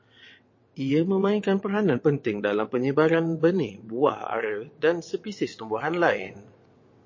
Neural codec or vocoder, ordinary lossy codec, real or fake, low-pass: vocoder, 22.05 kHz, 80 mel bands, WaveNeXt; MP3, 32 kbps; fake; 7.2 kHz